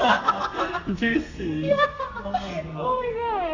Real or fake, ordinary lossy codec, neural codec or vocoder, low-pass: fake; AAC, 48 kbps; codec, 32 kHz, 1.9 kbps, SNAC; 7.2 kHz